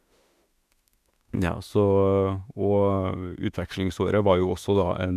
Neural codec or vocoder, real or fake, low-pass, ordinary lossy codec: autoencoder, 48 kHz, 32 numbers a frame, DAC-VAE, trained on Japanese speech; fake; 14.4 kHz; none